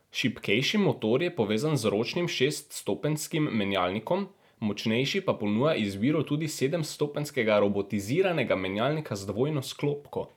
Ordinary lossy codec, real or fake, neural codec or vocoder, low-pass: none; real; none; 19.8 kHz